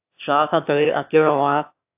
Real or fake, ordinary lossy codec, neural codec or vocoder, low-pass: fake; AAC, 32 kbps; autoencoder, 22.05 kHz, a latent of 192 numbers a frame, VITS, trained on one speaker; 3.6 kHz